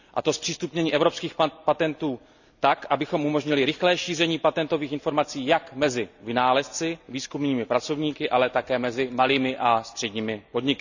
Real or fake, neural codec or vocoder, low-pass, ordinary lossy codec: real; none; 7.2 kHz; none